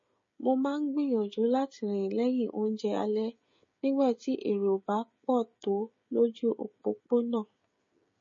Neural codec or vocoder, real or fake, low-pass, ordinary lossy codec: codec, 16 kHz, 16 kbps, FreqCodec, smaller model; fake; 7.2 kHz; MP3, 32 kbps